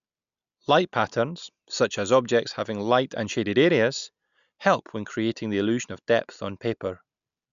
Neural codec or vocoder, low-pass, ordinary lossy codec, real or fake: none; 7.2 kHz; none; real